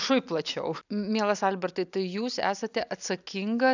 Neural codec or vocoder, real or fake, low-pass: none; real; 7.2 kHz